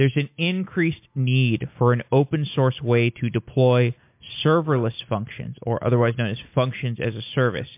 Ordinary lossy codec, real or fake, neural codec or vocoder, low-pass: MP3, 32 kbps; real; none; 3.6 kHz